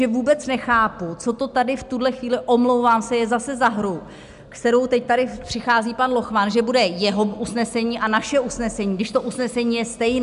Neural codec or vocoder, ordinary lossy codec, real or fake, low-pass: none; MP3, 96 kbps; real; 10.8 kHz